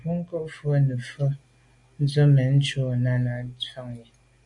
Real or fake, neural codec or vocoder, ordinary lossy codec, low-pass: real; none; AAC, 64 kbps; 10.8 kHz